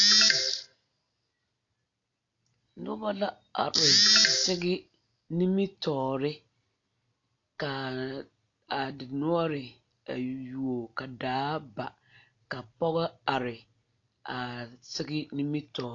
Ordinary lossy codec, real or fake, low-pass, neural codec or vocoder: AAC, 48 kbps; real; 7.2 kHz; none